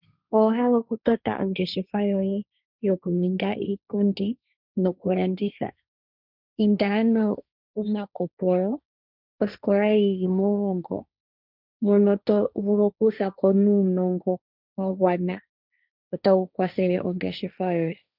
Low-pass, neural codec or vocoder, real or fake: 5.4 kHz; codec, 16 kHz, 1.1 kbps, Voila-Tokenizer; fake